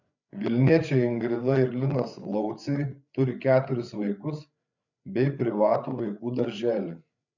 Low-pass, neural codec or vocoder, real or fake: 7.2 kHz; codec, 16 kHz, 8 kbps, FreqCodec, larger model; fake